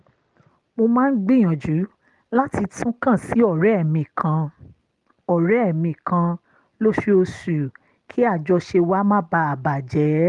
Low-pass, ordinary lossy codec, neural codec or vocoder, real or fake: 10.8 kHz; Opus, 24 kbps; none; real